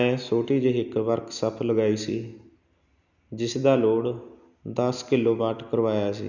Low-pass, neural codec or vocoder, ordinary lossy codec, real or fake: 7.2 kHz; none; none; real